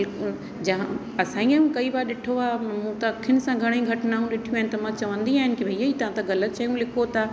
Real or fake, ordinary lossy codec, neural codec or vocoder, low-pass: real; none; none; none